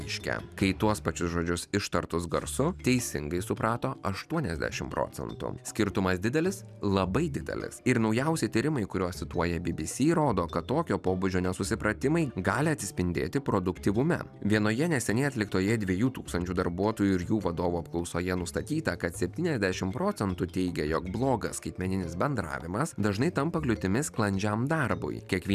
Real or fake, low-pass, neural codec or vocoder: real; 14.4 kHz; none